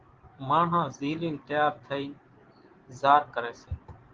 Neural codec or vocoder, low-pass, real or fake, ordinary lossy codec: none; 7.2 kHz; real; Opus, 16 kbps